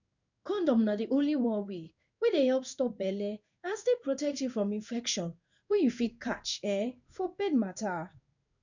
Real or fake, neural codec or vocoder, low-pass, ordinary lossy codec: fake; codec, 16 kHz in and 24 kHz out, 1 kbps, XY-Tokenizer; 7.2 kHz; none